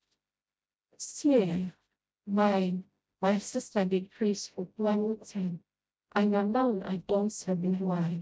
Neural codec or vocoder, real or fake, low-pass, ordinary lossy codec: codec, 16 kHz, 0.5 kbps, FreqCodec, smaller model; fake; none; none